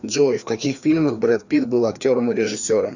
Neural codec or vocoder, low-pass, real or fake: codec, 16 kHz, 2 kbps, FreqCodec, larger model; 7.2 kHz; fake